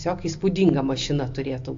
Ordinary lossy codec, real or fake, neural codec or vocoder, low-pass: AAC, 48 kbps; real; none; 7.2 kHz